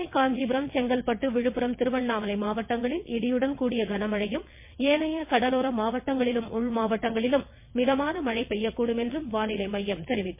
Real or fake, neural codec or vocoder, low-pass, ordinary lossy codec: fake; vocoder, 22.05 kHz, 80 mel bands, WaveNeXt; 3.6 kHz; MP3, 24 kbps